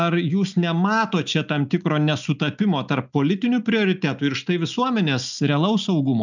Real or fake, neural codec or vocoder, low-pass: real; none; 7.2 kHz